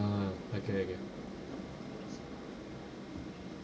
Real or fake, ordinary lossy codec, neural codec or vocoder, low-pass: real; none; none; none